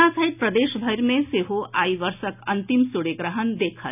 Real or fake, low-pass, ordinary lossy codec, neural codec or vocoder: real; 3.6 kHz; none; none